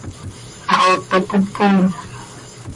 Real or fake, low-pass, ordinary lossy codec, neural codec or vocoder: fake; 10.8 kHz; MP3, 48 kbps; vocoder, 44.1 kHz, 128 mel bands, Pupu-Vocoder